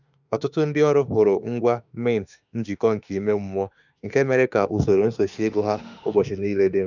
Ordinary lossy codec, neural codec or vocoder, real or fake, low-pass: none; autoencoder, 48 kHz, 32 numbers a frame, DAC-VAE, trained on Japanese speech; fake; 7.2 kHz